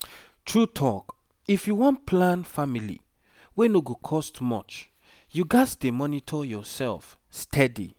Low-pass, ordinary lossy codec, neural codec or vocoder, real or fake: none; none; none; real